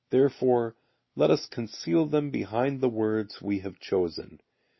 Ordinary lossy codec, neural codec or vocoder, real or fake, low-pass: MP3, 24 kbps; none; real; 7.2 kHz